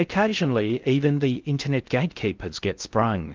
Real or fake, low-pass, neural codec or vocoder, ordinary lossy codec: fake; 7.2 kHz; codec, 16 kHz in and 24 kHz out, 0.6 kbps, FocalCodec, streaming, 2048 codes; Opus, 24 kbps